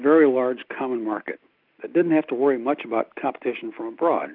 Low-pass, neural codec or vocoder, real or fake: 5.4 kHz; none; real